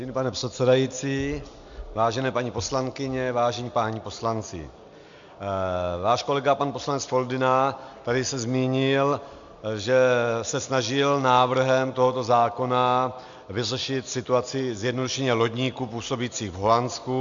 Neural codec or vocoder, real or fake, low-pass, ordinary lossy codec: none; real; 7.2 kHz; MP3, 64 kbps